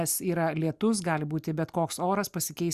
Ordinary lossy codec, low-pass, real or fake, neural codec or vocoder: Opus, 64 kbps; 14.4 kHz; real; none